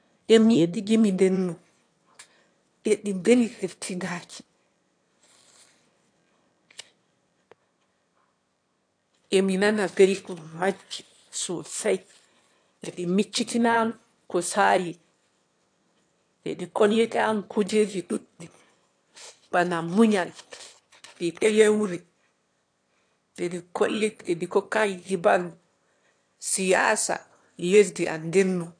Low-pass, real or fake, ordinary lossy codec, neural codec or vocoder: 9.9 kHz; fake; AAC, 64 kbps; autoencoder, 22.05 kHz, a latent of 192 numbers a frame, VITS, trained on one speaker